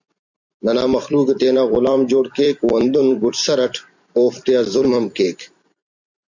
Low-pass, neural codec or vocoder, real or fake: 7.2 kHz; vocoder, 44.1 kHz, 128 mel bands every 256 samples, BigVGAN v2; fake